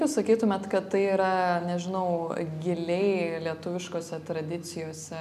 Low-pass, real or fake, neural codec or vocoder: 14.4 kHz; real; none